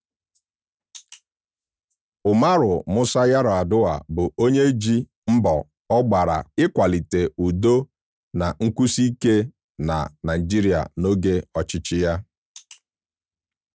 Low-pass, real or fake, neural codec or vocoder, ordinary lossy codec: none; real; none; none